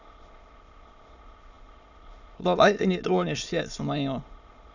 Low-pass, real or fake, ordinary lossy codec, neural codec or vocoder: 7.2 kHz; fake; none; autoencoder, 22.05 kHz, a latent of 192 numbers a frame, VITS, trained on many speakers